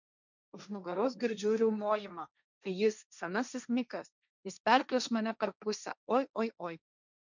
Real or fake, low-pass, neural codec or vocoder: fake; 7.2 kHz; codec, 16 kHz, 1.1 kbps, Voila-Tokenizer